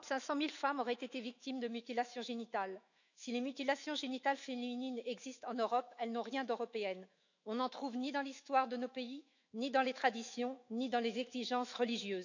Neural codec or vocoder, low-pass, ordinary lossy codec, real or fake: autoencoder, 48 kHz, 128 numbers a frame, DAC-VAE, trained on Japanese speech; 7.2 kHz; none; fake